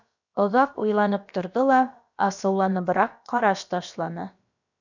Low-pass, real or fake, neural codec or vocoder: 7.2 kHz; fake; codec, 16 kHz, about 1 kbps, DyCAST, with the encoder's durations